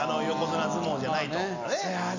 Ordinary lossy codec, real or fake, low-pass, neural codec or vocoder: none; real; 7.2 kHz; none